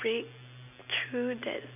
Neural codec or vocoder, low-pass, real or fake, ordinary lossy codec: none; 3.6 kHz; real; none